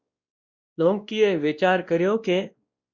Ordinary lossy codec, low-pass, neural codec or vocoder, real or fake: Opus, 64 kbps; 7.2 kHz; codec, 16 kHz, 1 kbps, X-Codec, WavLM features, trained on Multilingual LibriSpeech; fake